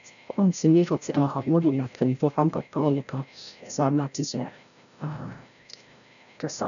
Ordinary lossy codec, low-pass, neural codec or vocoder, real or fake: none; 7.2 kHz; codec, 16 kHz, 0.5 kbps, FreqCodec, larger model; fake